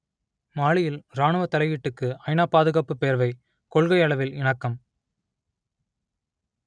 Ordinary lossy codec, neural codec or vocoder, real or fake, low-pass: none; none; real; 9.9 kHz